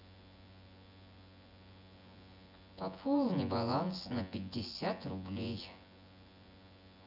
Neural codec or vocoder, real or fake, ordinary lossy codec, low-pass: vocoder, 24 kHz, 100 mel bands, Vocos; fake; none; 5.4 kHz